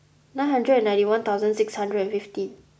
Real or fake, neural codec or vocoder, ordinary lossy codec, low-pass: real; none; none; none